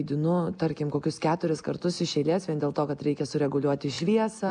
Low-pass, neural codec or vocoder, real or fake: 9.9 kHz; none; real